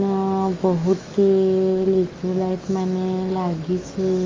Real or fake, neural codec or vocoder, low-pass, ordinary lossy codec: fake; autoencoder, 48 kHz, 128 numbers a frame, DAC-VAE, trained on Japanese speech; 7.2 kHz; Opus, 32 kbps